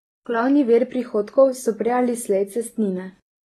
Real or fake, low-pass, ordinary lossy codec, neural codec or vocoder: real; 19.8 kHz; AAC, 32 kbps; none